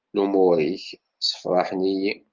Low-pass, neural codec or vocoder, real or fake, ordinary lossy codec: 7.2 kHz; none; real; Opus, 32 kbps